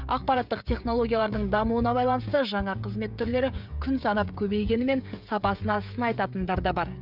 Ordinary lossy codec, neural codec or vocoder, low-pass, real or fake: none; codec, 16 kHz, 16 kbps, FreqCodec, smaller model; 5.4 kHz; fake